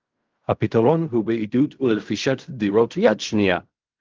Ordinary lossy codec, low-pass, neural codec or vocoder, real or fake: Opus, 32 kbps; 7.2 kHz; codec, 16 kHz in and 24 kHz out, 0.4 kbps, LongCat-Audio-Codec, fine tuned four codebook decoder; fake